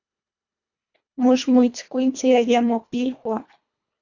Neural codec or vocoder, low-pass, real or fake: codec, 24 kHz, 1.5 kbps, HILCodec; 7.2 kHz; fake